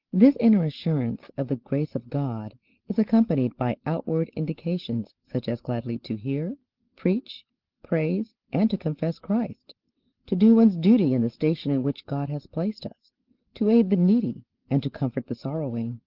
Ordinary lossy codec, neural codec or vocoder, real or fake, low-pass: Opus, 16 kbps; none; real; 5.4 kHz